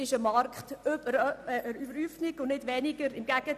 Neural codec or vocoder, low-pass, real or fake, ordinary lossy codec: none; 14.4 kHz; real; none